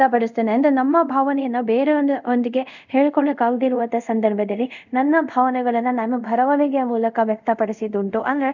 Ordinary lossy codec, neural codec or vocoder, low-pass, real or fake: none; codec, 24 kHz, 0.5 kbps, DualCodec; 7.2 kHz; fake